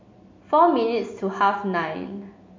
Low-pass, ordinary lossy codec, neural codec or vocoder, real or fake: 7.2 kHz; MP3, 48 kbps; none; real